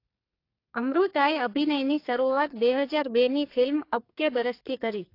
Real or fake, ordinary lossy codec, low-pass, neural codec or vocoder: fake; AAC, 32 kbps; 5.4 kHz; codec, 44.1 kHz, 2.6 kbps, SNAC